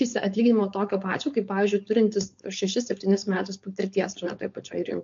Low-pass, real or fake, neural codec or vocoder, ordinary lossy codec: 7.2 kHz; fake; codec, 16 kHz, 4.8 kbps, FACodec; MP3, 48 kbps